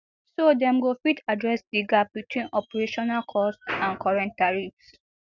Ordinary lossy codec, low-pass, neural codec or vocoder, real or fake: none; 7.2 kHz; none; real